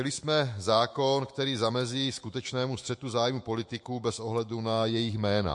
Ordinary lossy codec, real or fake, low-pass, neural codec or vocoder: MP3, 48 kbps; fake; 10.8 kHz; autoencoder, 48 kHz, 128 numbers a frame, DAC-VAE, trained on Japanese speech